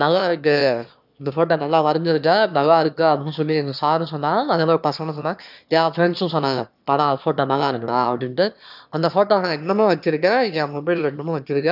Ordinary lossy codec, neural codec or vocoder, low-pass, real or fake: none; autoencoder, 22.05 kHz, a latent of 192 numbers a frame, VITS, trained on one speaker; 5.4 kHz; fake